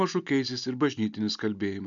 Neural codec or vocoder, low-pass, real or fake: none; 7.2 kHz; real